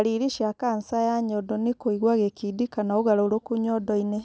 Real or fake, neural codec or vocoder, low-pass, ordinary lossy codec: real; none; none; none